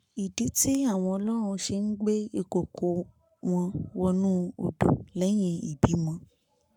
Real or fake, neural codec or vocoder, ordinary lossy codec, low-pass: fake; codec, 44.1 kHz, 7.8 kbps, Pupu-Codec; none; 19.8 kHz